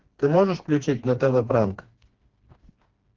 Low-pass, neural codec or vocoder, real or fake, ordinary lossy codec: 7.2 kHz; codec, 16 kHz, 2 kbps, FreqCodec, smaller model; fake; Opus, 16 kbps